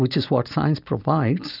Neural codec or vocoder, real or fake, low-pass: none; real; 5.4 kHz